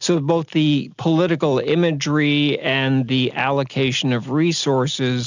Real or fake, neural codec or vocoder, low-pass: real; none; 7.2 kHz